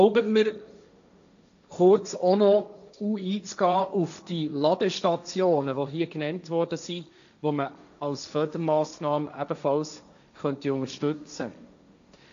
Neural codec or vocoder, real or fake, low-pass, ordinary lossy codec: codec, 16 kHz, 1.1 kbps, Voila-Tokenizer; fake; 7.2 kHz; AAC, 96 kbps